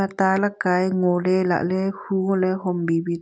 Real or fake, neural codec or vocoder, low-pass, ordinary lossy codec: real; none; none; none